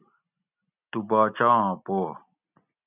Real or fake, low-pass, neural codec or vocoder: real; 3.6 kHz; none